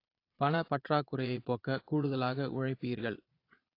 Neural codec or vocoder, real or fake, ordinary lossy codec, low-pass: vocoder, 22.05 kHz, 80 mel bands, Vocos; fake; AAC, 32 kbps; 5.4 kHz